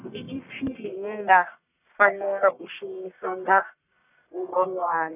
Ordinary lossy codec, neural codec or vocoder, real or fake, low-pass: none; codec, 44.1 kHz, 1.7 kbps, Pupu-Codec; fake; 3.6 kHz